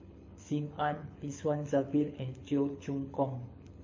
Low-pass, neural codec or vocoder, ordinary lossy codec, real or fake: 7.2 kHz; codec, 24 kHz, 6 kbps, HILCodec; MP3, 32 kbps; fake